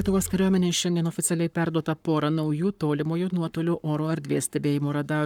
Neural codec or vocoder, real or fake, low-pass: codec, 44.1 kHz, 7.8 kbps, Pupu-Codec; fake; 19.8 kHz